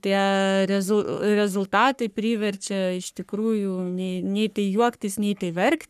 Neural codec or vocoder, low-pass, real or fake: codec, 44.1 kHz, 3.4 kbps, Pupu-Codec; 14.4 kHz; fake